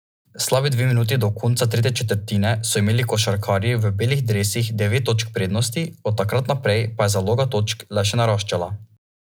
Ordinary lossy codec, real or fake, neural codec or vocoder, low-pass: none; real; none; none